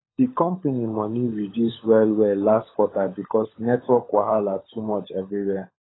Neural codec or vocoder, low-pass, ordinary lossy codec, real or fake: codec, 16 kHz, 16 kbps, FunCodec, trained on LibriTTS, 50 frames a second; 7.2 kHz; AAC, 16 kbps; fake